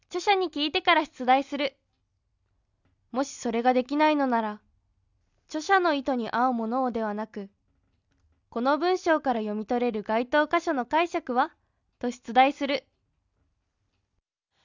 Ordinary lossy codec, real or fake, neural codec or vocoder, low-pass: none; real; none; 7.2 kHz